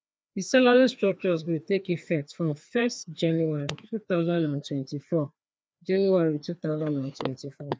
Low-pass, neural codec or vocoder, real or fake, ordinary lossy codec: none; codec, 16 kHz, 2 kbps, FreqCodec, larger model; fake; none